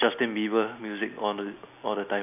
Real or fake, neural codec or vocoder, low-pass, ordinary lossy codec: real; none; 3.6 kHz; none